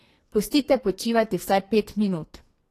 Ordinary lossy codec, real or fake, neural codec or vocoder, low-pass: AAC, 48 kbps; fake; codec, 44.1 kHz, 2.6 kbps, SNAC; 14.4 kHz